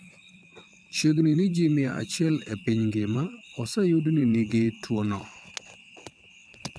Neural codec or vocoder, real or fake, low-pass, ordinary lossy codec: vocoder, 22.05 kHz, 80 mel bands, WaveNeXt; fake; none; none